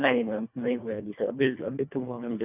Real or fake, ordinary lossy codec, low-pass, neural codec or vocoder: fake; none; 3.6 kHz; codec, 24 kHz, 1.5 kbps, HILCodec